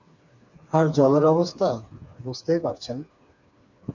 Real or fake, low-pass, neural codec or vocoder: fake; 7.2 kHz; codec, 16 kHz, 4 kbps, FreqCodec, smaller model